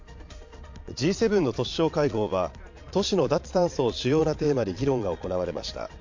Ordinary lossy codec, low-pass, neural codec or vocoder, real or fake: MP3, 48 kbps; 7.2 kHz; vocoder, 22.05 kHz, 80 mel bands, Vocos; fake